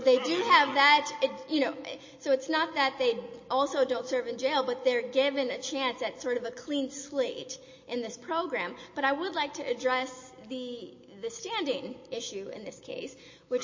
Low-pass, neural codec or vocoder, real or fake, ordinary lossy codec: 7.2 kHz; none; real; MP3, 32 kbps